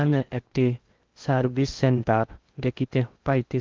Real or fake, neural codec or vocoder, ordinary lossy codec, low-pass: fake; codec, 16 kHz in and 24 kHz out, 0.6 kbps, FocalCodec, streaming, 4096 codes; Opus, 24 kbps; 7.2 kHz